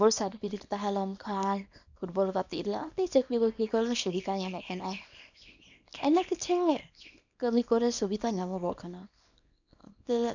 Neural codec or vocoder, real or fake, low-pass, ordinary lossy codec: codec, 24 kHz, 0.9 kbps, WavTokenizer, small release; fake; 7.2 kHz; none